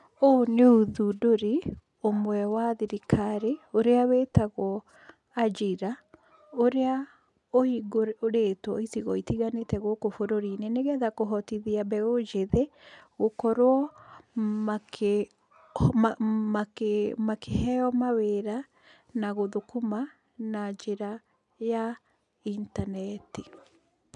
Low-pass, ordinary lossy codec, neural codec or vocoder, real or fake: 10.8 kHz; none; none; real